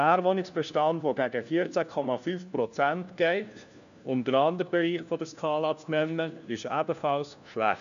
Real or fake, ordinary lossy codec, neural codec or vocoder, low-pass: fake; none; codec, 16 kHz, 1 kbps, FunCodec, trained on LibriTTS, 50 frames a second; 7.2 kHz